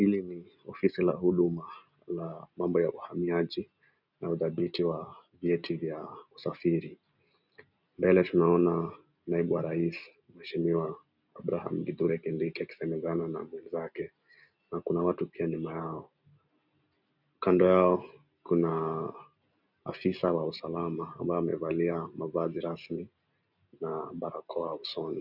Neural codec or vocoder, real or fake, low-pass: none; real; 5.4 kHz